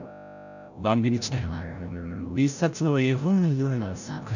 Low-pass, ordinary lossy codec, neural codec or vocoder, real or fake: 7.2 kHz; none; codec, 16 kHz, 0.5 kbps, FreqCodec, larger model; fake